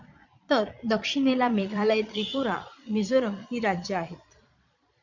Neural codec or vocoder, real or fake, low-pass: vocoder, 22.05 kHz, 80 mel bands, Vocos; fake; 7.2 kHz